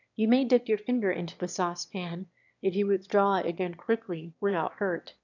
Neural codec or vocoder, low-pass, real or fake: autoencoder, 22.05 kHz, a latent of 192 numbers a frame, VITS, trained on one speaker; 7.2 kHz; fake